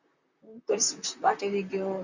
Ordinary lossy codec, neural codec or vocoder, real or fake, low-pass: Opus, 64 kbps; vocoder, 44.1 kHz, 128 mel bands, Pupu-Vocoder; fake; 7.2 kHz